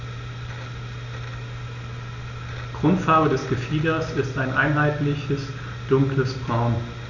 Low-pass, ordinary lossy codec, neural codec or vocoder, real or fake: 7.2 kHz; none; none; real